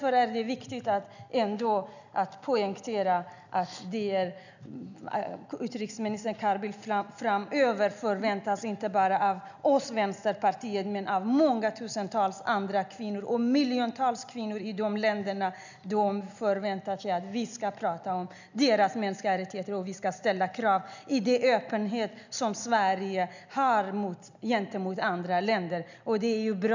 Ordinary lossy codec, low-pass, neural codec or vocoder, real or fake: none; 7.2 kHz; none; real